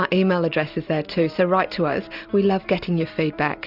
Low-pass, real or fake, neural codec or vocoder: 5.4 kHz; real; none